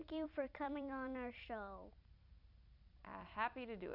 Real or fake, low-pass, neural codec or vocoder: fake; 5.4 kHz; vocoder, 44.1 kHz, 128 mel bands every 256 samples, BigVGAN v2